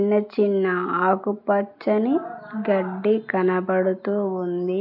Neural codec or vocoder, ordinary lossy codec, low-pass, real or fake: none; none; 5.4 kHz; real